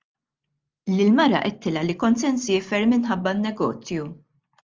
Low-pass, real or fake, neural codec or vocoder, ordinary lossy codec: 7.2 kHz; real; none; Opus, 32 kbps